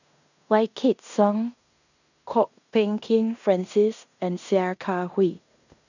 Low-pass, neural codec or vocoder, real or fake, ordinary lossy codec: 7.2 kHz; codec, 16 kHz in and 24 kHz out, 0.9 kbps, LongCat-Audio-Codec, fine tuned four codebook decoder; fake; none